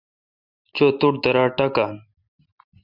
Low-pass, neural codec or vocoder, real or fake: 5.4 kHz; none; real